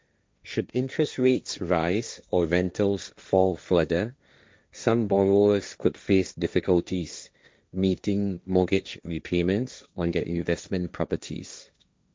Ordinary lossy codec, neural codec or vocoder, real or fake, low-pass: none; codec, 16 kHz, 1.1 kbps, Voila-Tokenizer; fake; none